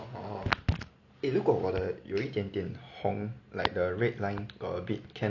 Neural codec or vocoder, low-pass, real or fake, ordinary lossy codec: none; 7.2 kHz; real; none